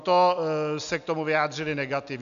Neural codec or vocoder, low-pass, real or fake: none; 7.2 kHz; real